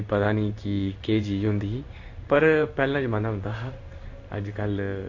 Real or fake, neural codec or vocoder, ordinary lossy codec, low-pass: fake; codec, 16 kHz in and 24 kHz out, 1 kbps, XY-Tokenizer; AAC, 48 kbps; 7.2 kHz